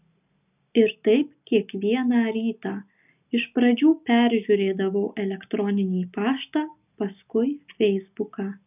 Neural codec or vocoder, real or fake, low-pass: none; real; 3.6 kHz